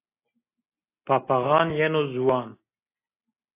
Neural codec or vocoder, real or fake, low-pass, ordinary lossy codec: none; real; 3.6 kHz; AAC, 24 kbps